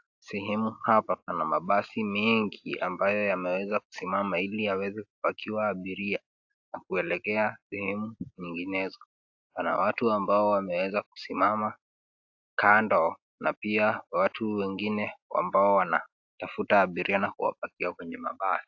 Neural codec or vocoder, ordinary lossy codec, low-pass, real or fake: none; AAC, 48 kbps; 7.2 kHz; real